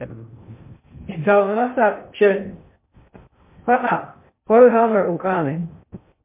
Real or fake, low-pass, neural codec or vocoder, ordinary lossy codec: fake; 3.6 kHz; codec, 16 kHz in and 24 kHz out, 0.6 kbps, FocalCodec, streaming, 2048 codes; MP3, 24 kbps